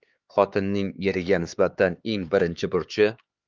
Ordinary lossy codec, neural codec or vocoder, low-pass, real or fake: Opus, 32 kbps; codec, 16 kHz, 4 kbps, X-Codec, HuBERT features, trained on LibriSpeech; 7.2 kHz; fake